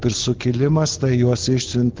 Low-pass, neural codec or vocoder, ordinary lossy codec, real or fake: 7.2 kHz; none; Opus, 16 kbps; real